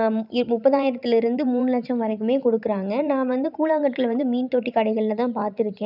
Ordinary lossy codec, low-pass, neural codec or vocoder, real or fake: none; 5.4 kHz; vocoder, 44.1 kHz, 128 mel bands every 512 samples, BigVGAN v2; fake